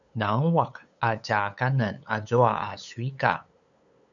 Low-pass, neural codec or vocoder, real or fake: 7.2 kHz; codec, 16 kHz, 8 kbps, FunCodec, trained on LibriTTS, 25 frames a second; fake